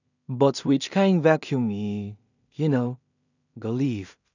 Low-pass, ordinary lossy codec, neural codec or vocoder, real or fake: 7.2 kHz; none; codec, 16 kHz in and 24 kHz out, 0.4 kbps, LongCat-Audio-Codec, two codebook decoder; fake